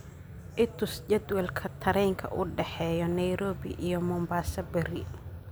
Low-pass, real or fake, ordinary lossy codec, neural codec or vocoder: none; real; none; none